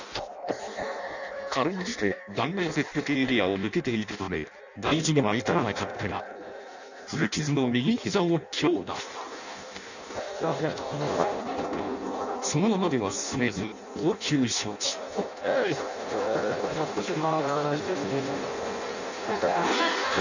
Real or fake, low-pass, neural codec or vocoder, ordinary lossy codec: fake; 7.2 kHz; codec, 16 kHz in and 24 kHz out, 0.6 kbps, FireRedTTS-2 codec; none